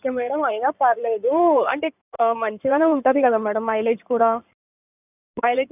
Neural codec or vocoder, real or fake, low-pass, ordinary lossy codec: codec, 16 kHz in and 24 kHz out, 2.2 kbps, FireRedTTS-2 codec; fake; 3.6 kHz; none